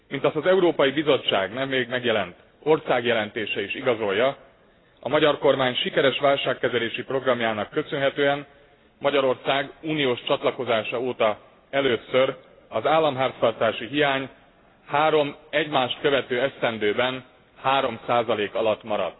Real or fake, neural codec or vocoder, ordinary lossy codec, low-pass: real; none; AAC, 16 kbps; 7.2 kHz